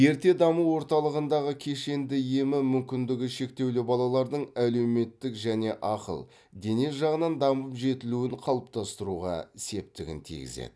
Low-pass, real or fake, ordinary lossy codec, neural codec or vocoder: none; real; none; none